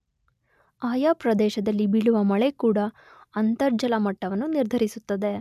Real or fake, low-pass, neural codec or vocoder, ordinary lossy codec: real; 14.4 kHz; none; none